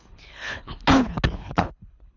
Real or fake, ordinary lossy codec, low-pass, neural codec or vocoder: fake; none; 7.2 kHz; codec, 24 kHz, 3 kbps, HILCodec